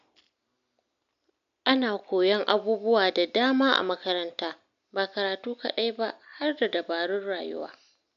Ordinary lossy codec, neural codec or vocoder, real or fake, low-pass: MP3, 64 kbps; none; real; 7.2 kHz